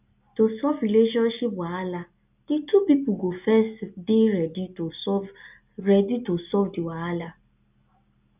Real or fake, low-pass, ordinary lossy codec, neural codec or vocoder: real; 3.6 kHz; none; none